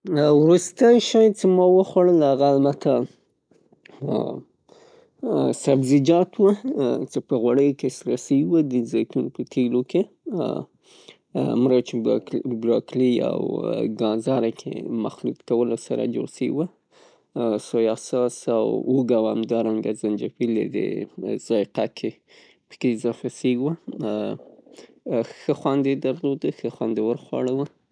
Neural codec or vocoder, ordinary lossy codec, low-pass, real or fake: none; none; 9.9 kHz; real